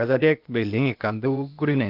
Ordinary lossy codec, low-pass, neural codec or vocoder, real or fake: Opus, 24 kbps; 5.4 kHz; codec, 16 kHz, 0.8 kbps, ZipCodec; fake